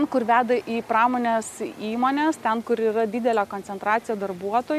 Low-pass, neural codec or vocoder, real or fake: 14.4 kHz; none; real